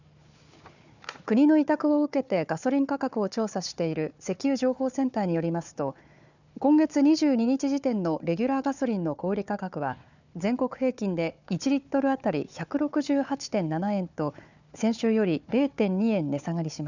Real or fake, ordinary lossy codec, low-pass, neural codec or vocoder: fake; none; 7.2 kHz; codec, 16 kHz, 4 kbps, FunCodec, trained on Chinese and English, 50 frames a second